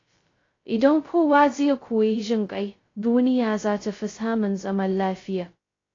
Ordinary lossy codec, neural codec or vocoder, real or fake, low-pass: AAC, 32 kbps; codec, 16 kHz, 0.2 kbps, FocalCodec; fake; 7.2 kHz